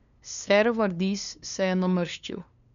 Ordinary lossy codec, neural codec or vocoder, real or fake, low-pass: none; codec, 16 kHz, 2 kbps, FunCodec, trained on LibriTTS, 25 frames a second; fake; 7.2 kHz